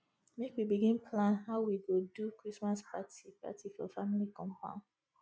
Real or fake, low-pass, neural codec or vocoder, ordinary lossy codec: real; none; none; none